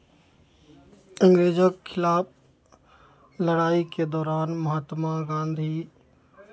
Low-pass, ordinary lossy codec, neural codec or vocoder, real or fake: none; none; none; real